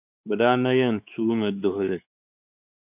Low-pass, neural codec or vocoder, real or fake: 3.6 kHz; codec, 16 kHz, 4 kbps, X-Codec, WavLM features, trained on Multilingual LibriSpeech; fake